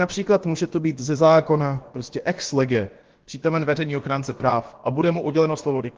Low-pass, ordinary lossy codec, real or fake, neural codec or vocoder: 7.2 kHz; Opus, 16 kbps; fake; codec, 16 kHz, about 1 kbps, DyCAST, with the encoder's durations